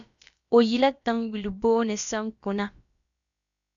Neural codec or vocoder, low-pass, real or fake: codec, 16 kHz, about 1 kbps, DyCAST, with the encoder's durations; 7.2 kHz; fake